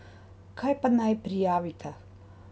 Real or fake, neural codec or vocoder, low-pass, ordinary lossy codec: real; none; none; none